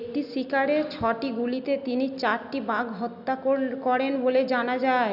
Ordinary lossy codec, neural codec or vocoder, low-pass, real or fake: none; none; 5.4 kHz; real